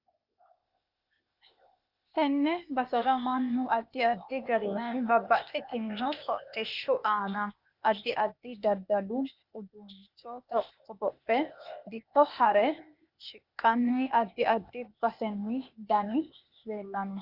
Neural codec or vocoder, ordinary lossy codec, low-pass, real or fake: codec, 16 kHz, 0.8 kbps, ZipCodec; Opus, 64 kbps; 5.4 kHz; fake